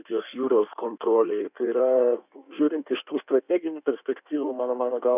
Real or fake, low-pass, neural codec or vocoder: fake; 3.6 kHz; codec, 16 kHz in and 24 kHz out, 1.1 kbps, FireRedTTS-2 codec